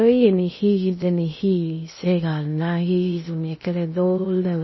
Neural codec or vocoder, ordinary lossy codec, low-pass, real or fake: codec, 16 kHz in and 24 kHz out, 0.6 kbps, FocalCodec, streaming, 2048 codes; MP3, 24 kbps; 7.2 kHz; fake